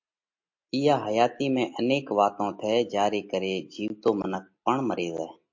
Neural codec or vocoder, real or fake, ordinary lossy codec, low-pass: none; real; MP3, 48 kbps; 7.2 kHz